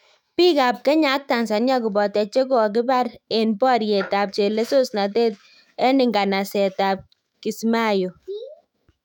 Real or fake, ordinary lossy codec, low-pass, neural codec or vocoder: fake; none; 19.8 kHz; autoencoder, 48 kHz, 128 numbers a frame, DAC-VAE, trained on Japanese speech